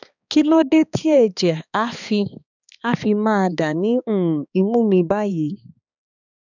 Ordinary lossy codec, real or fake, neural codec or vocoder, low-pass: none; fake; codec, 16 kHz, 2 kbps, X-Codec, HuBERT features, trained on balanced general audio; 7.2 kHz